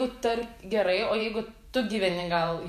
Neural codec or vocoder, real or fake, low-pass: vocoder, 48 kHz, 128 mel bands, Vocos; fake; 14.4 kHz